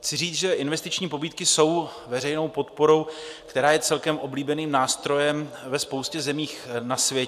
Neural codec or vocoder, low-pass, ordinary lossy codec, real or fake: none; 14.4 kHz; AAC, 96 kbps; real